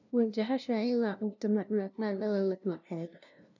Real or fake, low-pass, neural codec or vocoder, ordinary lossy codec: fake; 7.2 kHz; codec, 16 kHz, 0.5 kbps, FunCodec, trained on LibriTTS, 25 frames a second; none